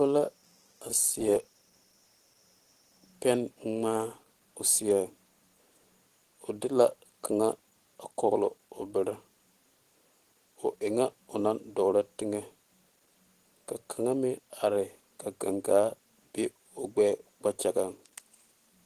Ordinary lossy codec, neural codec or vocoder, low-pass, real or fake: Opus, 16 kbps; vocoder, 44.1 kHz, 128 mel bands every 512 samples, BigVGAN v2; 14.4 kHz; fake